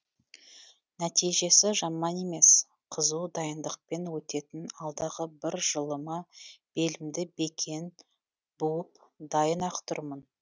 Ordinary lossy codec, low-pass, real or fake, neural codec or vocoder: none; none; real; none